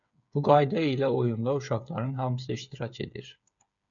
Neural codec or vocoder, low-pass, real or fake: codec, 16 kHz, 8 kbps, FreqCodec, smaller model; 7.2 kHz; fake